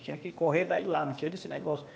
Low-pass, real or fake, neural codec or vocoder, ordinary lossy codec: none; fake; codec, 16 kHz, 0.8 kbps, ZipCodec; none